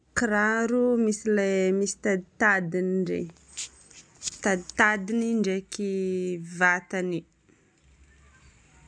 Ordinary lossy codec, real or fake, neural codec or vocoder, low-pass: none; real; none; 9.9 kHz